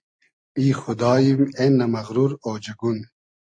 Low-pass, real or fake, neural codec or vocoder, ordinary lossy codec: 9.9 kHz; real; none; AAC, 64 kbps